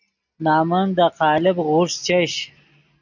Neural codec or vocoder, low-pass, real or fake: none; 7.2 kHz; real